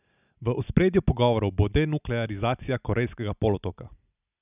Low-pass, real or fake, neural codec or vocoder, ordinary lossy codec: 3.6 kHz; real; none; none